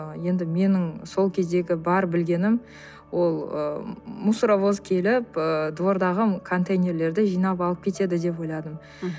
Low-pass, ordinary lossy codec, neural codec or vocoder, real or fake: none; none; none; real